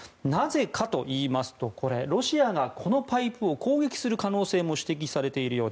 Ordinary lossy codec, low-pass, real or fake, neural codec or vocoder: none; none; real; none